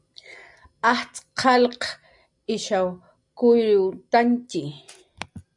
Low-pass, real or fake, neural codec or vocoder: 10.8 kHz; real; none